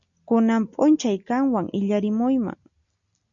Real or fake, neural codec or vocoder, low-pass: real; none; 7.2 kHz